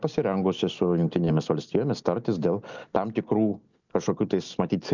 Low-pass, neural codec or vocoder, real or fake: 7.2 kHz; none; real